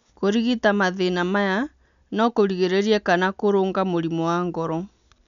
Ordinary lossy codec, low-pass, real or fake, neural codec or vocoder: none; 7.2 kHz; real; none